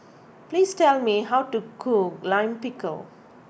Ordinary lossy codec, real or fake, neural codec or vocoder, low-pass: none; real; none; none